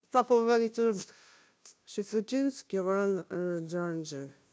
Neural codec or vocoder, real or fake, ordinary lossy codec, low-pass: codec, 16 kHz, 0.5 kbps, FunCodec, trained on Chinese and English, 25 frames a second; fake; none; none